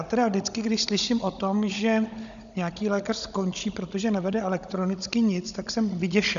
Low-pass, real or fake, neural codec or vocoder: 7.2 kHz; fake; codec, 16 kHz, 16 kbps, FunCodec, trained on Chinese and English, 50 frames a second